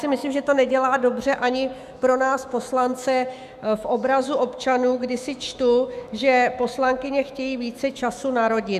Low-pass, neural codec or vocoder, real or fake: 14.4 kHz; autoencoder, 48 kHz, 128 numbers a frame, DAC-VAE, trained on Japanese speech; fake